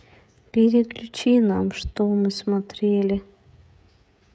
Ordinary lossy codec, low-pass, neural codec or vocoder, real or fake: none; none; codec, 16 kHz, 16 kbps, FreqCodec, smaller model; fake